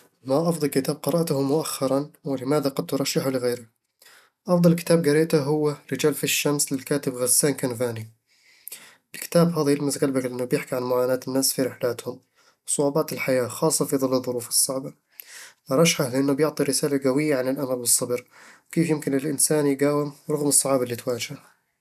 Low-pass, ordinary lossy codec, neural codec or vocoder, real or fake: 14.4 kHz; none; none; real